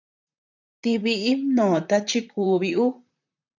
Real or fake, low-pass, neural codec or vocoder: fake; 7.2 kHz; vocoder, 44.1 kHz, 128 mel bands, Pupu-Vocoder